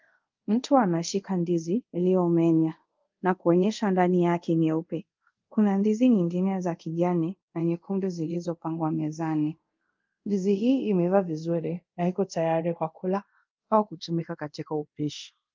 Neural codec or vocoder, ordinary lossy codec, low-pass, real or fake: codec, 24 kHz, 0.5 kbps, DualCodec; Opus, 32 kbps; 7.2 kHz; fake